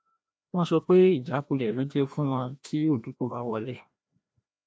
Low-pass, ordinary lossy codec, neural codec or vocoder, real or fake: none; none; codec, 16 kHz, 1 kbps, FreqCodec, larger model; fake